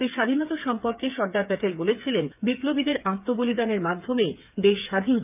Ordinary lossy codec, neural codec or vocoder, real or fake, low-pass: none; codec, 16 kHz, 8 kbps, FreqCodec, smaller model; fake; 3.6 kHz